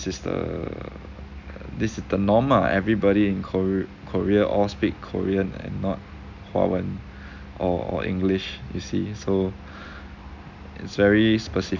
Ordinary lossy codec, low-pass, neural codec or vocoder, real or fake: none; 7.2 kHz; none; real